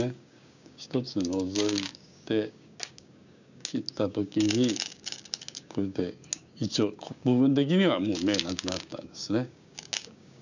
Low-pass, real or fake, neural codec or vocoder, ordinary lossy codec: 7.2 kHz; fake; codec, 16 kHz, 6 kbps, DAC; none